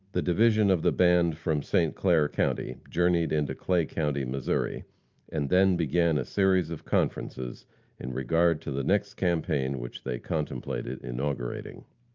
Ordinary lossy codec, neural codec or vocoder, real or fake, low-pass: Opus, 24 kbps; none; real; 7.2 kHz